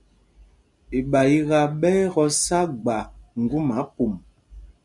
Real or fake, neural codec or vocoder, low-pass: real; none; 10.8 kHz